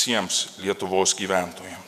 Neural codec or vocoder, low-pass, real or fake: vocoder, 48 kHz, 128 mel bands, Vocos; 14.4 kHz; fake